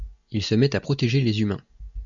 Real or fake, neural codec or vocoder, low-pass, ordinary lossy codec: real; none; 7.2 kHz; MP3, 64 kbps